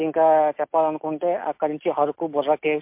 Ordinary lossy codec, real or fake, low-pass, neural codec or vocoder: MP3, 32 kbps; real; 3.6 kHz; none